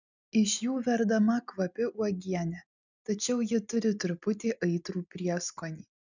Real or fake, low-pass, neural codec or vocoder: real; 7.2 kHz; none